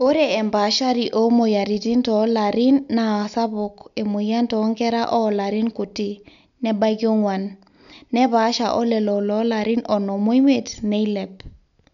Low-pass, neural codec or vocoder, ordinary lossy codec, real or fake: 7.2 kHz; none; none; real